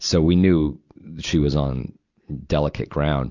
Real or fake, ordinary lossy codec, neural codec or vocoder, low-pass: real; Opus, 64 kbps; none; 7.2 kHz